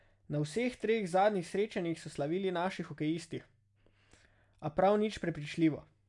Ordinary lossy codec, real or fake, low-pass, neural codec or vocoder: none; real; 10.8 kHz; none